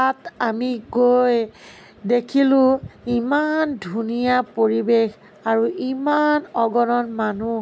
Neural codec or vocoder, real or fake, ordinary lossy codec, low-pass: none; real; none; none